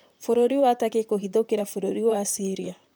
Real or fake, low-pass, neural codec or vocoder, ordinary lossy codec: fake; none; vocoder, 44.1 kHz, 128 mel bands, Pupu-Vocoder; none